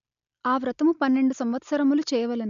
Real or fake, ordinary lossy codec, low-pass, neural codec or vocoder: real; none; 7.2 kHz; none